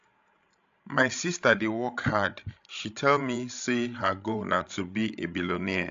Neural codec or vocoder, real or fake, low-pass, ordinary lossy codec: codec, 16 kHz, 16 kbps, FreqCodec, larger model; fake; 7.2 kHz; AAC, 64 kbps